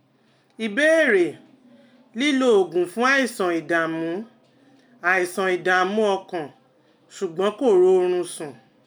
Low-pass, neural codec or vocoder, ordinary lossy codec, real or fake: 19.8 kHz; none; none; real